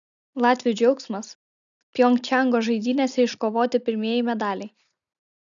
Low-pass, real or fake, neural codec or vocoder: 7.2 kHz; real; none